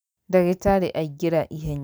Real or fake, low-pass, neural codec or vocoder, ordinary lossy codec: real; none; none; none